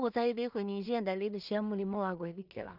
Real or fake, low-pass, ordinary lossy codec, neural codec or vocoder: fake; 5.4 kHz; none; codec, 16 kHz in and 24 kHz out, 0.4 kbps, LongCat-Audio-Codec, two codebook decoder